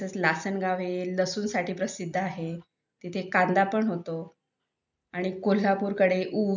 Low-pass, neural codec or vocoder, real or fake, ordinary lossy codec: 7.2 kHz; none; real; none